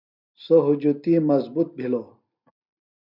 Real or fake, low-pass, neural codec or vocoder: real; 5.4 kHz; none